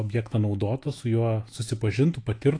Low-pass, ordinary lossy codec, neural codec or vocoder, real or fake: 9.9 kHz; AAC, 48 kbps; autoencoder, 48 kHz, 128 numbers a frame, DAC-VAE, trained on Japanese speech; fake